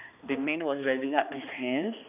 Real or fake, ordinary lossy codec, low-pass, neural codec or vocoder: fake; none; 3.6 kHz; codec, 16 kHz, 2 kbps, X-Codec, HuBERT features, trained on balanced general audio